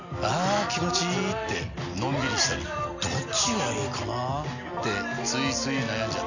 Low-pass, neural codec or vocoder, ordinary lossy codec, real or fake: 7.2 kHz; none; none; real